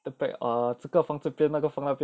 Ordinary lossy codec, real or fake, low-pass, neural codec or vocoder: none; real; none; none